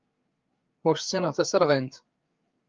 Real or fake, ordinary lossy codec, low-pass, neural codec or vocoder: fake; Opus, 32 kbps; 7.2 kHz; codec, 16 kHz, 4 kbps, FreqCodec, larger model